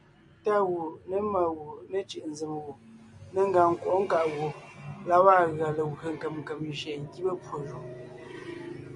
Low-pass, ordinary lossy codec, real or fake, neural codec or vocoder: 10.8 kHz; MP3, 48 kbps; real; none